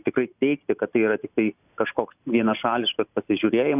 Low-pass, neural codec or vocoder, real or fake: 3.6 kHz; none; real